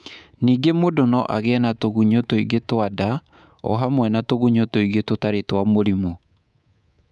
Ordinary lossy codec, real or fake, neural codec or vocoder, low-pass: none; fake; codec, 24 kHz, 3.1 kbps, DualCodec; none